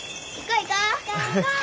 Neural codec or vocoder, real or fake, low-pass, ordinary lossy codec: none; real; none; none